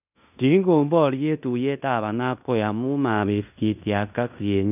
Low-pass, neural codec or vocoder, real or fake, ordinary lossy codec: 3.6 kHz; codec, 16 kHz in and 24 kHz out, 0.9 kbps, LongCat-Audio-Codec, fine tuned four codebook decoder; fake; none